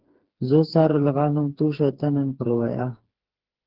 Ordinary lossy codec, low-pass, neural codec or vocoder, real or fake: Opus, 16 kbps; 5.4 kHz; codec, 16 kHz, 4 kbps, FreqCodec, smaller model; fake